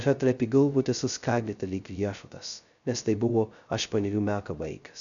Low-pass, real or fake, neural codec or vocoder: 7.2 kHz; fake; codec, 16 kHz, 0.2 kbps, FocalCodec